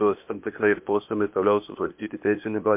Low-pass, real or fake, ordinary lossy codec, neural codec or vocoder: 3.6 kHz; fake; MP3, 32 kbps; codec, 16 kHz, 0.8 kbps, ZipCodec